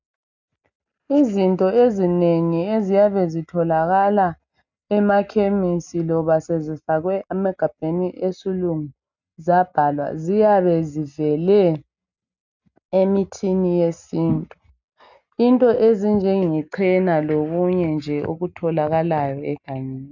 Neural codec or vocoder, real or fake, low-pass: none; real; 7.2 kHz